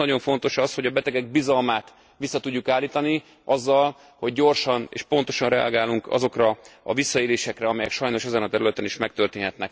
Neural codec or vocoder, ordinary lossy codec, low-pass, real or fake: none; none; none; real